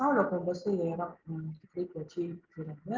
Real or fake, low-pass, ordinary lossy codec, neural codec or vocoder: real; 7.2 kHz; Opus, 32 kbps; none